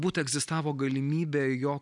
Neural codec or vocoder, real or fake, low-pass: none; real; 10.8 kHz